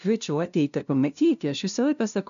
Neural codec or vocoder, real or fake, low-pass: codec, 16 kHz, 0.5 kbps, FunCodec, trained on LibriTTS, 25 frames a second; fake; 7.2 kHz